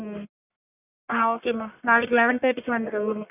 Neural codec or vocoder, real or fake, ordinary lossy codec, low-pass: codec, 44.1 kHz, 1.7 kbps, Pupu-Codec; fake; none; 3.6 kHz